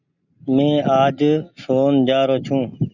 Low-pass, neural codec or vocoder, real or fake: 7.2 kHz; none; real